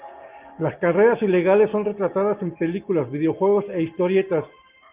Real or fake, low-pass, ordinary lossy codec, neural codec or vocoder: real; 3.6 kHz; Opus, 32 kbps; none